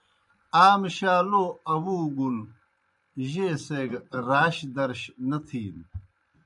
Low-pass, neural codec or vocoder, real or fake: 10.8 kHz; vocoder, 24 kHz, 100 mel bands, Vocos; fake